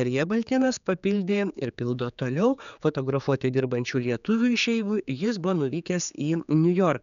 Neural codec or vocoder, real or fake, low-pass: codec, 16 kHz, 4 kbps, X-Codec, HuBERT features, trained on general audio; fake; 7.2 kHz